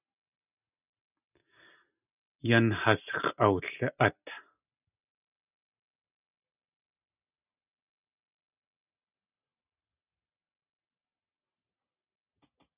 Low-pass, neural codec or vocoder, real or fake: 3.6 kHz; none; real